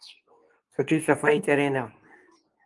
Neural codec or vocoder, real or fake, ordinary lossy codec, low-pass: codec, 24 kHz, 0.9 kbps, WavTokenizer, medium speech release version 2; fake; Opus, 32 kbps; 10.8 kHz